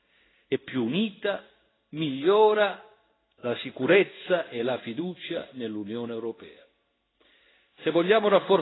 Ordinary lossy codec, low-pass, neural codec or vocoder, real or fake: AAC, 16 kbps; 7.2 kHz; codec, 16 kHz in and 24 kHz out, 1 kbps, XY-Tokenizer; fake